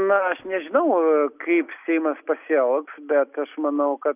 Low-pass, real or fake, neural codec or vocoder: 3.6 kHz; real; none